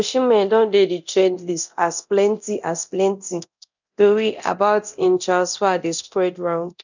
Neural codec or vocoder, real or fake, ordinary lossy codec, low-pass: codec, 24 kHz, 0.9 kbps, DualCodec; fake; none; 7.2 kHz